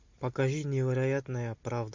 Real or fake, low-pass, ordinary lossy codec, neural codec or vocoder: real; 7.2 kHz; MP3, 64 kbps; none